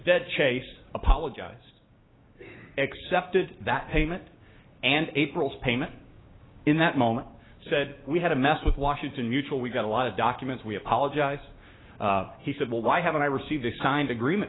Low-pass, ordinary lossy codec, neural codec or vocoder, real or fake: 7.2 kHz; AAC, 16 kbps; codec, 16 kHz, 6 kbps, DAC; fake